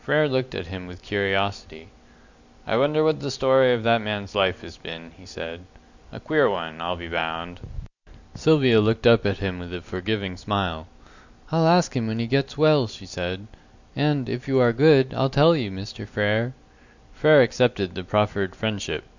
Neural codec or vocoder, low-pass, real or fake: none; 7.2 kHz; real